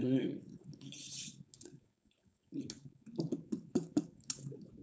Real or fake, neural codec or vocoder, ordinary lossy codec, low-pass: fake; codec, 16 kHz, 4.8 kbps, FACodec; none; none